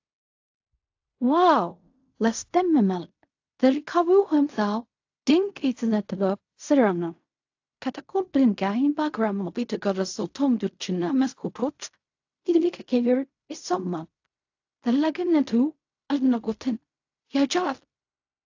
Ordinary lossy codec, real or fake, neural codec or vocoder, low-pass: AAC, 48 kbps; fake; codec, 16 kHz in and 24 kHz out, 0.4 kbps, LongCat-Audio-Codec, fine tuned four codebook decoder; 7.2 kHz